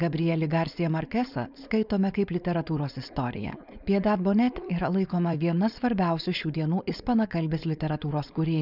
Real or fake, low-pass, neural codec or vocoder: fake; 5.4 kHz; codec, 16 kHz, 4.8 kbps, FACodec